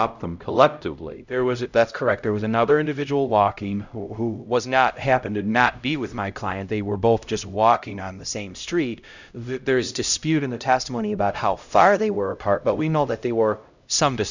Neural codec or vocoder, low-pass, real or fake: codec, 16 kHz, 0.5 kbps, X-Codec, HuBERT features, trained on LibriSpeech; 7.2 kHz; fake